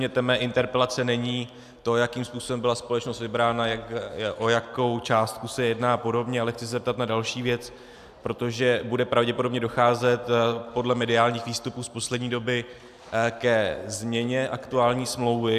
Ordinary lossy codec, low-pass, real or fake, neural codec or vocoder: AAC, 96 kbps; 14.4 kHz; real; none